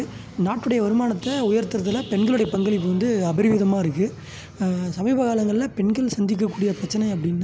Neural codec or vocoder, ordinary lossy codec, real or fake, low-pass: none; none; real; none